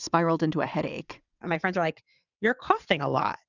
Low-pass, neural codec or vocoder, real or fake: 7.2 kHz; vocoder, 22.05 kHz, 80 mel bands, WaveNeXt; fake